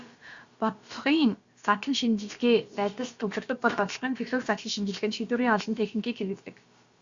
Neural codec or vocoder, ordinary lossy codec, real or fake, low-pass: codec, 16 kHz, about 1 kbps, DyCAST, with the encoder's durations; Opus, 64 kbps; fake; 7.2 kHz